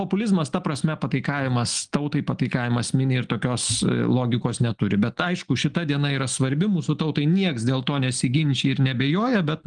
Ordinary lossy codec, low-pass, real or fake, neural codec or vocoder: Opus, 24 kbps; 9.9 kHz; real; none